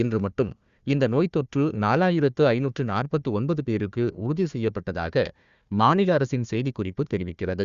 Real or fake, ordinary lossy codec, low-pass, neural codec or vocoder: fake; Opus, 64 kbps; 7.2 kHz; codec, 16 kHz, 1 kbps, FunCodec, trained on Chinese and English, 50 frames a second